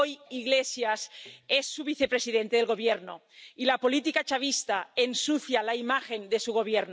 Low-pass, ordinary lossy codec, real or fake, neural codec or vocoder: none; none; real; none